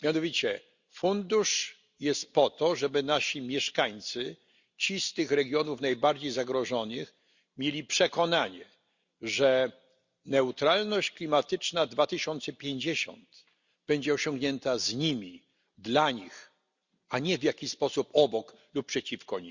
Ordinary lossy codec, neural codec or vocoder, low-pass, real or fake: Opus, 64 kbps; none; 7.2 kHz; real